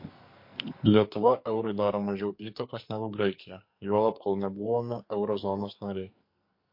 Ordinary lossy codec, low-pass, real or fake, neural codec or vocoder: MP3, 32 kbps; 5.4 kHz; fake; codec, 44.1 kHz, 2.6 kbps, SNAC